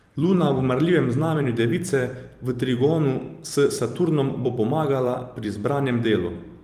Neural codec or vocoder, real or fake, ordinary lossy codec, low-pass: vocoder, 44.1 kHz, 128 mel bands every 256 samples, BigVGAN v2; fake; Opus, 32 kbps; 14.4 kHz